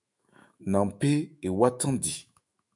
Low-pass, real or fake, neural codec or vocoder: 10.8 kHz; fake; autoencoder, 48 kHz, 128 numbers a frame, DAC-VAE, trained on Japanese speech